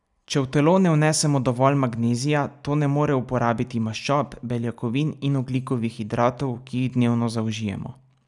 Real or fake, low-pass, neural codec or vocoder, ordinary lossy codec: real; 10.8 kHz; none; none